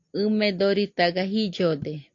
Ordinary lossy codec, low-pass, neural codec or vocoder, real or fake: AAC, 48 kbps; 7.2 kHz; none; real